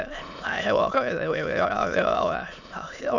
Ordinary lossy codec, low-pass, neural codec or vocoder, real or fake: none; 7.2 kHz; autoencoder, 22.05 kHz, a latent of 192 numbers a frame, VITS, trained on many speakers; fake